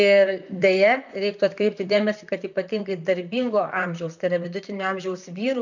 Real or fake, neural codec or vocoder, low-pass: fake; vocoder, 44.1 kHz, 128 mel bands, Pupu-Vocoder; 7.2 kHz